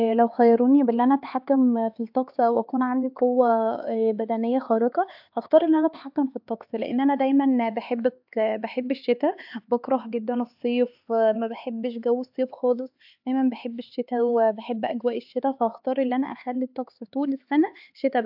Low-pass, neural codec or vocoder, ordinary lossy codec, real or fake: 5.4 kHz; codec, 16 kHz, 4 kbps, X-Codec, HuBERT features, trained on LibriSpeech; AAC, 48 kbps; fake